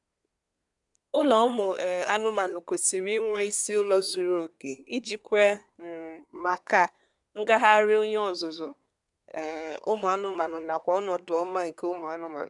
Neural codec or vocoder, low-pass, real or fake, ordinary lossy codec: codec, 24 kHz, 1 kbps, SNAC; 10.8 kHz; fake; none